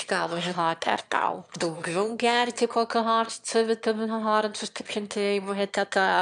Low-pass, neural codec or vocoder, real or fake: 9.9 kHz; autoencoder, 22.05 kHz, a latent of 192 numbers a frame, VITS, trained on one speaker; fake